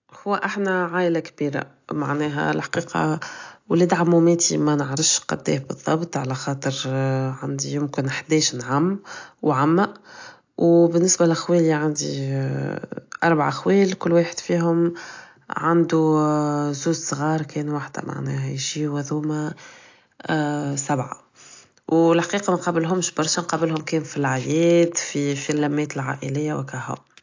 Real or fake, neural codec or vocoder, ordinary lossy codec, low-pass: real; none; AAC, 48 kbps; 7.2 kHz